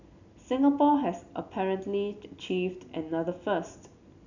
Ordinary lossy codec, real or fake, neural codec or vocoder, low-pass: none; real; none; 7.2 kHz